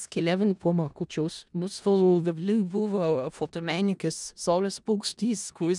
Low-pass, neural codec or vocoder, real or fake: 10.8 kHz; codec, 16 kHz in and 24 kHz out, 0.4 kbps, LongCat-Audio-Codec, four codebook decoder; fake